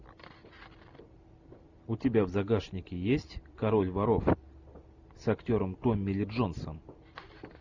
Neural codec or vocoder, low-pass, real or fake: none; 7.2 kHz; real